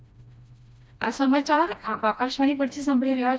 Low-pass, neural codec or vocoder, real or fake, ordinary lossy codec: none; codec, 16 kHz, 1 kbps, FreqCodec, smaller model; fake; none